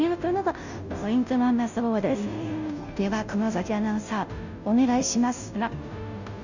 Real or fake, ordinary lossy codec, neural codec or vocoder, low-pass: fake; none; codec, 16 kHz, 0.5 kbps, FunCodec, trained on Chinese and English, 25 frames a second; 7.2 kHz